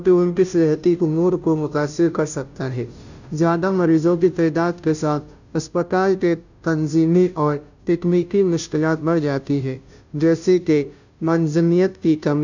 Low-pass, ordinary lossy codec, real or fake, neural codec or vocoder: 7.2 kHz; none; fake; codec, 16 kHz, 0.5 kbps, FunCodec, trained on Chinese and English, 25 frames a second